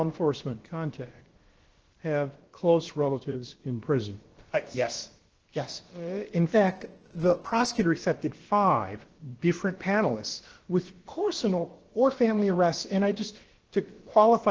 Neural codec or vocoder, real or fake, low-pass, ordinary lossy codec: codec, 16 kHz, about 1 kbps, DyCAST, with the encoder's durations; fake; 7.2 kHz; Opus, 16 kbps